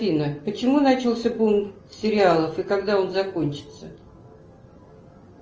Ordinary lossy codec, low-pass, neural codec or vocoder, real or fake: Opus, 24 kbps; 7.2 kHz; none; real